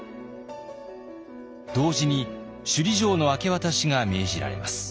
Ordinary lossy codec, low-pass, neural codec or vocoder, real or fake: none; none; none; real